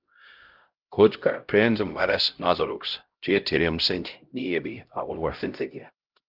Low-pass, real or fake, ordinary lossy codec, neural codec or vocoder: 5.4 kHz; fake; Opus, 32 kbps; codec, 16 kHz, 0.5 kbps, X-Codec, HuBERT features, trained on LibriSpeech